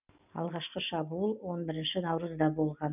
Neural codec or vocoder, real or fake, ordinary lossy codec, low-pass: none; real; none; 3.6 kHz